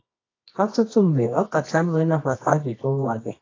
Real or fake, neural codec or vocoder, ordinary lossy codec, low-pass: fake; codec, 24 kHz, 0.9 kbps, WavTokenizer, medium music audio release; AAC, 32 kbps; 7.2 kHz